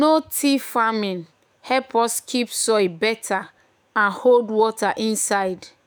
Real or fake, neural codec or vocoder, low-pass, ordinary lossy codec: fake; autoencoder, 48 kHz, 128 numbers a frame, DAC-VAE, trained on Japanese speech; none; none